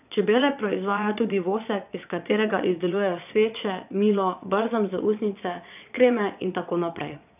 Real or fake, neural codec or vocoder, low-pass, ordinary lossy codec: fake; vocoder, 22.05 kHz, 80 mel bands, Vocos; 3.6 kHz; none